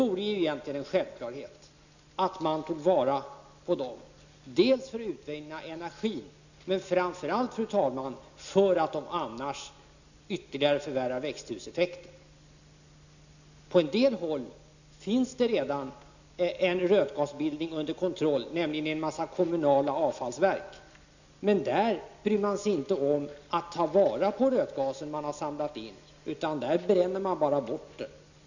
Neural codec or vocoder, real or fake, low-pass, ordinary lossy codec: none; real; 7.2 kHz; none